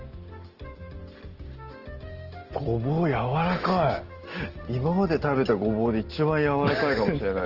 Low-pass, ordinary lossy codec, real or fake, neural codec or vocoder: 5.4 kHz; Opus, 16 kbps; real; none